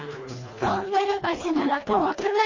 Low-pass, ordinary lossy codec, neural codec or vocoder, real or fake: 7.2 kHz; MP3, 32 kbps; codec, 24 kHz, 1.5 kbps, HILCodec; fake